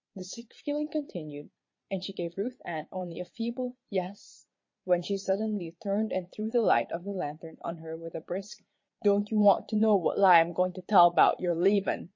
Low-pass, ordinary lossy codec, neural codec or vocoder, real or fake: 7.2 kHz; MP3, 32 kbps; vocoder, 22.05 kHz, 80 mel bands, Vocos; fake